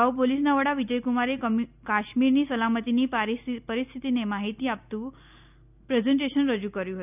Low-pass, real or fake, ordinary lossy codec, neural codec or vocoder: 3.6 kHz; real; none; none